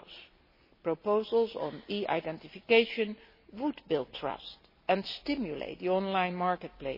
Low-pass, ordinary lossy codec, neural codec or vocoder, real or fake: 5.4 kHz; MP3, 24 kbps; none; real